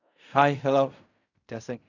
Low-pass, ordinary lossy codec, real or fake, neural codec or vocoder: 7.2 kHz; none; fake; codec, 16 kHz in and 24 kHz out, 0.4 kbps, LongCat-Audio-Codec, fine tuned four codebook decoder